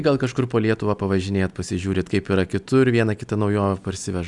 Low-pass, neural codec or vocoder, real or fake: 10.8 kHz; none; real